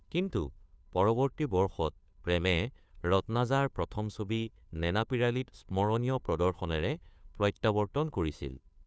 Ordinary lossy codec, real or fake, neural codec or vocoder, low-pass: none; fake; codec, 16 kHz, 2 kbps, FunCodec, trained on Chinese and English, 25 frames a second; none